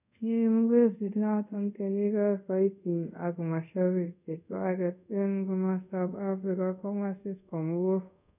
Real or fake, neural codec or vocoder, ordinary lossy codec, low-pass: fake; codec, 24 kHz, 0.5 kbps, DualCodec; none; 3.6 kHz